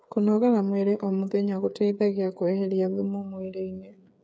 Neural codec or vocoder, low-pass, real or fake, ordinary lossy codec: codec, 16 kHz, 8 kbps, FreqCodec, smaller model; none; fake; none